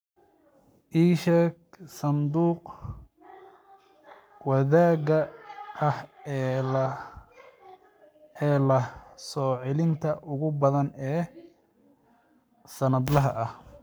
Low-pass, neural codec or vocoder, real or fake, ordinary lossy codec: none; codec, 44.1 kHz, 7.8 kbps, Pupu-Codec; fake; none